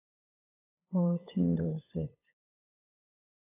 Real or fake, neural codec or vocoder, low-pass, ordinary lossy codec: fake; codec, 16 kHz, 8 kbps, FunCodec, trained on LibriTTS, 25 frames a second; 3.6 kHz; AAC, 24 kbps